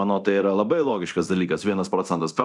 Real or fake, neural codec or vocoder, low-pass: fake; codec, 24 kHz, 0.9 kbps, DualCodec; 10.8 kHz